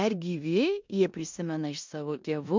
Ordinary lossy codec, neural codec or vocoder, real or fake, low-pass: MP3, 64 kbps; codec, 16 kHz in and 24 kHz out, 0.9 kbps, LongCat-Audio-Codec, four codebook decoder; fake; 7.2 kHz